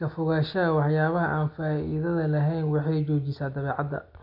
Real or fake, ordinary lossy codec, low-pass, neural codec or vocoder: real; MP3, 48 kbps; 5.4 kHz; none